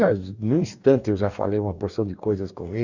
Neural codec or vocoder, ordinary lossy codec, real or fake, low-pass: codec, 44.1 kHz, 2.6 kbps, DAC; none; fake; 7.2 kHz